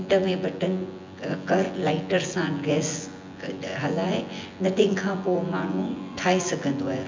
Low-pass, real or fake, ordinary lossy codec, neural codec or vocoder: 7.2 kHz; fake; MP3, 64 kbps; vocoder, 24 kHz, 100 mel bands, Vocos